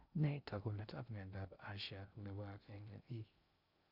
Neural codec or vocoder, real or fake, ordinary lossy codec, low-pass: codec, 16 kHz in and 24 kHz out, 0.6 kbps, FocalCodec, streaming, 2048 codes; fake; none; 5.4 kHz